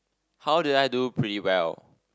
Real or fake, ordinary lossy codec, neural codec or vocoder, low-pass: real; none; none; none